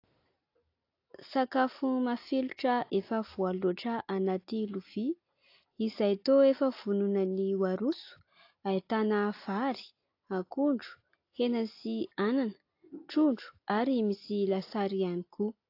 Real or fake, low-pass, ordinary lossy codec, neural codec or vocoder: real; 5.4 kHz; AAC, 32 kbps; none